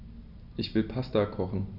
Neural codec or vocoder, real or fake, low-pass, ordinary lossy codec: none; real; 5.4 kHz; none